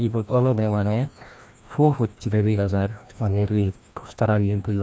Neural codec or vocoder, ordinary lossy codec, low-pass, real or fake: codec, 16 kHz, 1 kbps, FreqCodec, larger model; none; none; fake